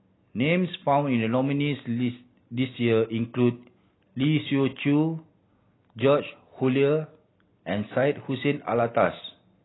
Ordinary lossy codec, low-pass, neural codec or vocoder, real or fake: AAC, 16 kbps; 7.2 kHz; none; real